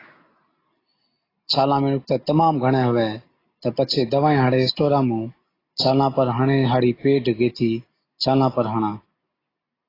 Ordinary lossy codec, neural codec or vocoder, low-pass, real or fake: AAC, 24 kbps; none; 5.4 kHz; real